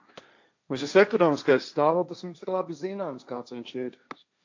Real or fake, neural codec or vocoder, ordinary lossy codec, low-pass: fake; codec, 16 kHz, 1.1 kbps, Voila-Tokenizer; AAC, 48 kbps; 7.2 kHz